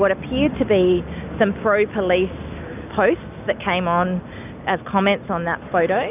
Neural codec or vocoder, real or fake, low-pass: none; real; 3.6 kHz